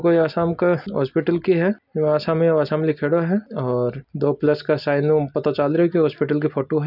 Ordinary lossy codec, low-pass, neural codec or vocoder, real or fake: none; 5.4 kHz; none; real